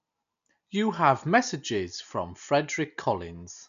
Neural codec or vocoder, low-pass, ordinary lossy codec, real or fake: none; 7.2 kHz; none; real